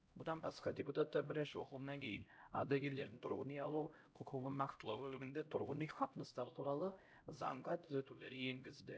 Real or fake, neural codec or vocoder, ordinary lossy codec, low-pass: fake; codec, 16 kHz, 0.5 kbps, X-Codec, HuBERT features, trained on LibriSpeech; none; none